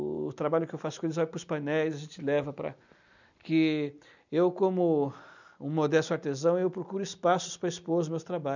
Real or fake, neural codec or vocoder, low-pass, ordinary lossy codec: real; none; 7.2 kHz; none